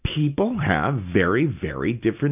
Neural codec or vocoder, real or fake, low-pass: none; real; 3.6 kHz